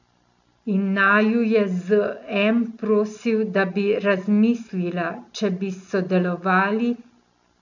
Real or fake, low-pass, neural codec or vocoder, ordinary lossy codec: real; 7.2 kHz; none; none